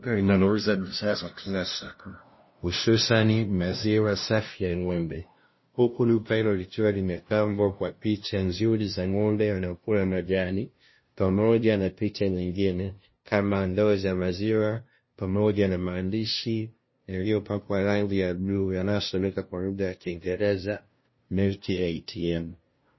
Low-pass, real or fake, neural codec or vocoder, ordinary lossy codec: 7.2 kHz; fake; codec, 16 kHz, 0.5 kbps, FunCodec, trained on LibriTTS, 25 frames a second; MP3, 24 kbps